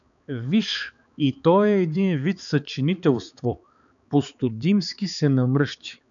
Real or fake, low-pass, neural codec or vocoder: fake; 7.2 kHz; codec, 16 kHz, 4 kbps, X-Codec, HuBERT features, trained on balanced general audio